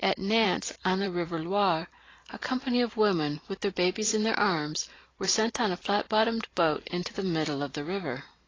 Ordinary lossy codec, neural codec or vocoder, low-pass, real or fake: AAC, 32 kbps; vocoder, 44.1 kHz, 128 mel bands every 512 samples, BigVGAN v2; 7.2 kHz; fake